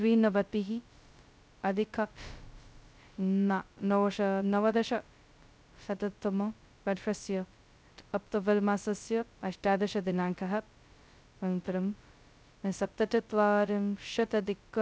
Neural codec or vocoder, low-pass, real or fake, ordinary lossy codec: codec, 16 kHz, 0.2 kbps, FocalCodec; none; fake; none